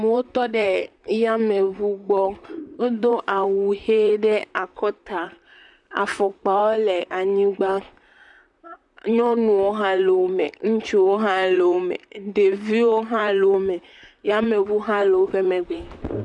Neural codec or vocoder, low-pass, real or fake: vocoder, 44.1 kHz, 128 mel bands, Pupu-Vocoder; 10.8 kHz; fake